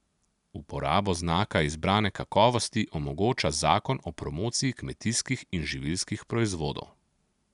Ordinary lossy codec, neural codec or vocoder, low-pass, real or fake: none; none; 10.8 kHz; real